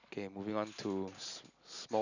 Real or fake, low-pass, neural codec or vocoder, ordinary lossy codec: real; 7.2 kHz; none; none